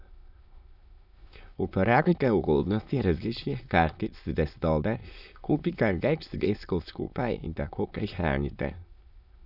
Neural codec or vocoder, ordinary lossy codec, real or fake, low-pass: autoencoder, 22.05 kHz, a latent of 192 numbers a frame, VITS, trained on many speakers; none; fake; 5.4 kHz